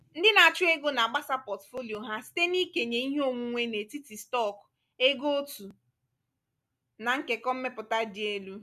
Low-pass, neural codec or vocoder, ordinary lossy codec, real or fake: 14.4 kHz; none; none; real